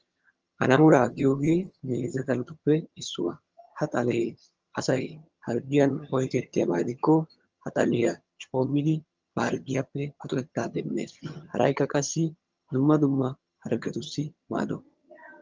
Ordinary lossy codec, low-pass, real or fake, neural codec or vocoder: Opus, 24 kbps; 7.2 kHz; fake; vocoder, 22.05 kHz, 80 mel bands, HiFi-GAN